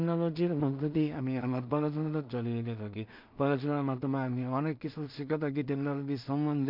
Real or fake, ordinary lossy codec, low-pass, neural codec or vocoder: fake; MP3, 48 kbps; 5.4 kHz; codec, 16 kHz in and 24 kHz out, 0.4 kbps, LongCat-Audio-Codec, two codebook decoder